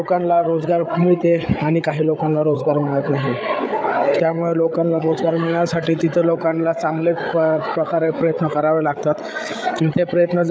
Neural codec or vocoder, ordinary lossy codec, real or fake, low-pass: codec, 16 kHz, 8 kbps, FreqCodec, larger model; none; fake; none